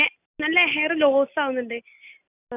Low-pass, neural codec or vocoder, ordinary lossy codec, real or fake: 3.6 kHz; none; none; real